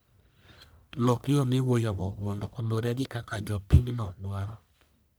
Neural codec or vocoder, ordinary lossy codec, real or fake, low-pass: codec, 44.1 kHz, 1.7 kbps, Pupu-Codec; none; fake; none